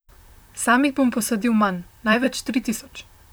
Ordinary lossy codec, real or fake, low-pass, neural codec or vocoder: none; fake; none; vocoder, 44.1 kHz, 128 mel bands, Pupu-Vocoder